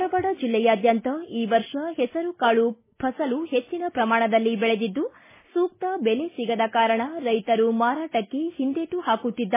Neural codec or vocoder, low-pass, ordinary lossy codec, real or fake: none; 3.6 kHz; MP3, 16 kbps; real